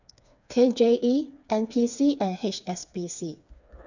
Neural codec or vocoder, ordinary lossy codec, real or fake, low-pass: codec, 16 kHz, 4 kbps, FreqCodec, smaller model; none; fake; 7.2 kHz